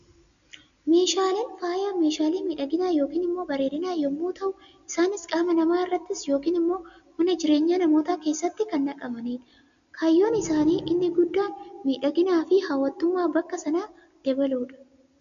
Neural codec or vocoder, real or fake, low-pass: none; real; 7.2 kHz